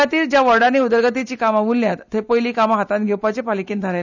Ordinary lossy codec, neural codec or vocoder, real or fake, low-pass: none; none; real; 7.2 kHz